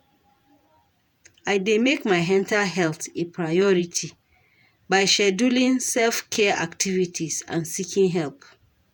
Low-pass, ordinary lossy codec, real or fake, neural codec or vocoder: none; none; fake; vocoder, 48 kHz, 128 mel bands, Vocos